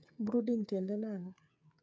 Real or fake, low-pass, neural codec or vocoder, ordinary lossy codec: fake; none; codec, 16 kHz, 8 kbps, FreqCodec, smaller model; none